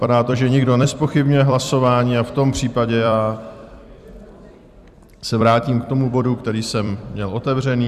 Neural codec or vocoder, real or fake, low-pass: vocoder, 44.1 kHz, 128 mel bands every 256 samples, BigVGAN v2; fake; 14.4 kHz